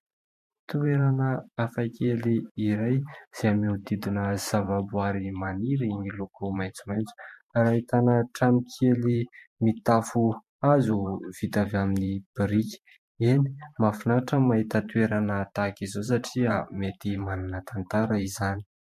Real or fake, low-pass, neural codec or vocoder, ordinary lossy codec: fake; 14.4 kHz; vocoder, 44.1 kHz, 128 mel bands every 512 samples, BigVGAN v2; MP3, 64 kbps